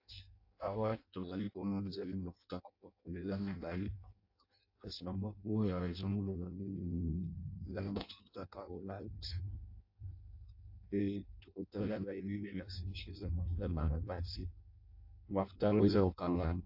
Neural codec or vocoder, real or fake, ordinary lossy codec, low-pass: codec, 16 kHz in and 24 kHz out, 0.6 kbps, FireRedTTS-2 codec; fake; AAC, 48 kbps; 5.4 kHz